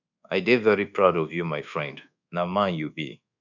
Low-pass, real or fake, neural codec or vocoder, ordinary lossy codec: 7.2 kHz; fake; codec, 24 kHz, 1.2 kbps, DualCodec; none